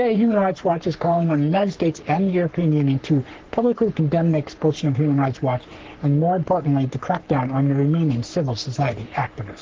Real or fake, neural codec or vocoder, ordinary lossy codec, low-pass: fake; codec, 44.1 kHz, 3.4 kbps, Pupu-Codec; Opus, 16 kbps; 7.2 kHz